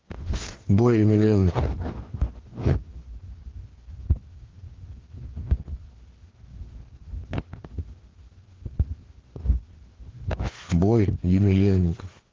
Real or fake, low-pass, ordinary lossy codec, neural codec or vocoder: fake; 7.2 kHz; Opus, 16 kbps; codec, 16 kHz, 2 kbps, FreqCodec, larger model